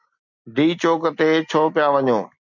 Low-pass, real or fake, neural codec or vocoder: 7.2 kHz; real; none